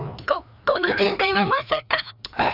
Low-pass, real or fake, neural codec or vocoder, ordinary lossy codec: 5.4 kHz; fake; codec, 16 kHz, 2 kbps, FreqCodec, larger model; none